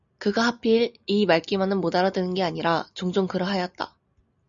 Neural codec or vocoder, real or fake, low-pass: none; real; 7.2 kHz